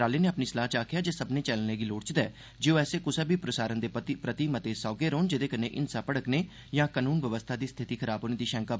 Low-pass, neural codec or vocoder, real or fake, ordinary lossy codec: none; none; real; none